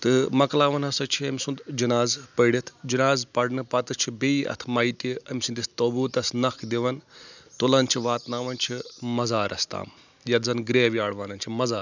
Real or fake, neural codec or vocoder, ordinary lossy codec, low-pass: real; none; none; 7.2 kHz